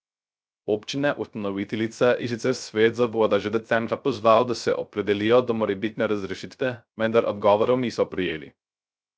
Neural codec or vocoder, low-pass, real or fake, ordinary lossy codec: codec, 16 kHz, 0.3 kbps, FocalCodec; none; fake; none